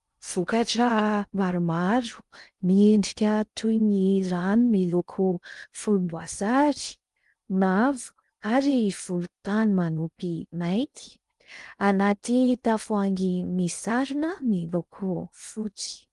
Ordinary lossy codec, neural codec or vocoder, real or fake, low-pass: Opus, 32 kbps; codec, 16 kHz in and 24 kHz out, 0.6 kbps, FocalCodec, streaming, 2048 codes; fake; 10.8 kHz